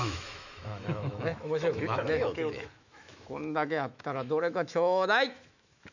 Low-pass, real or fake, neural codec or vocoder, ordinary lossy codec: 7.2 kHz; fake; autoencoder, 48 kHz, 128 numbers a frame, DAC-VAE, trained on Japanese speech; none